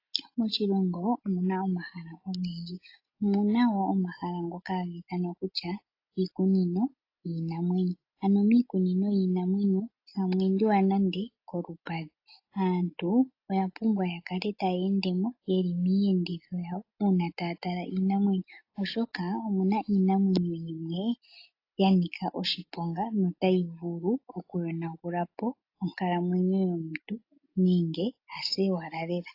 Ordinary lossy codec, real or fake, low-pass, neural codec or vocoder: AAC, 32 kbps; real; 5.4 kHz; none